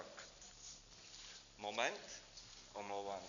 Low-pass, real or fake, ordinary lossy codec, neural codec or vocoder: 7.2 kHz; real; MP3, 96 kbps; none